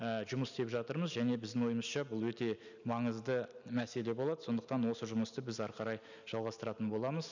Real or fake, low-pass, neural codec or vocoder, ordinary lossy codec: real; 7.2 kHz; none; none